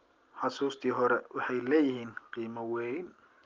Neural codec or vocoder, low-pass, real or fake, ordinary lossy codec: none; 7.2 kHz; real; Opus, 32 kbps